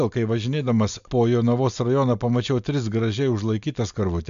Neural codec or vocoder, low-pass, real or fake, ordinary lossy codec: none; 7.2 kHz; real; MP3, 64 kbps